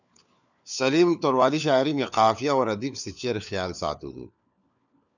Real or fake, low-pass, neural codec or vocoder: fake; 7.2 kHz; codec, 16 kHz, 4 kbps, FunCodec, trained on LibriTTS, 50 frames a second